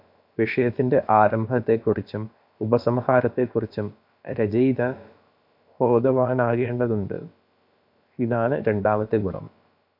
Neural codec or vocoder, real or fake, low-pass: codec, 16 kHz, about 1 kbps, DyCAST, with the encoder's durations; fake; 5.4 kHz